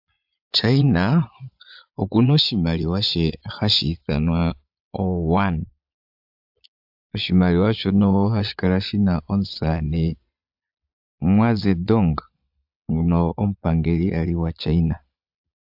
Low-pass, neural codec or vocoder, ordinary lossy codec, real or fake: 5.4 kHz; vocoder, 22.05 kHz, 80 mel bands, Vocos; AAC, 48 kbps; fake